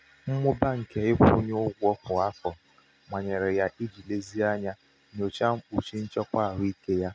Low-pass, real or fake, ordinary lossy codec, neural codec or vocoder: none; real; none; none